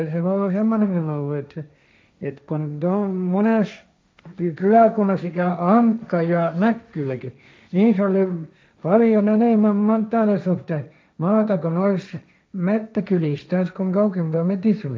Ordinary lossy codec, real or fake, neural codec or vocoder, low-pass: none; fake; codec, 16 kHz, 1.1 kbps, Voila-Tokenizer; none